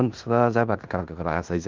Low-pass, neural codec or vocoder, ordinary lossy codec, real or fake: 7.2 kHz; codec, 16 kHz in and 24 kHz out, 0.9 kbps, LongCat-Audio-Codec, fine tuned four codebook decoder; Opus, 24 kbps; fake